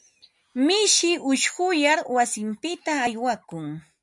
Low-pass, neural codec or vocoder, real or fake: 10.8 kHz; none; real